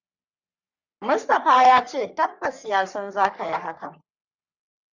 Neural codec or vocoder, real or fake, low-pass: codec, 44.1 kHz, 3.4 kbps, Pupu-Codec; fake; 7.2 kHz